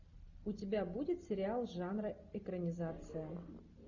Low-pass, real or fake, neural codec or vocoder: 7.2 kHz; real; none